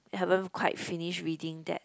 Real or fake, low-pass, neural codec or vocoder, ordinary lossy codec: real; none; none; none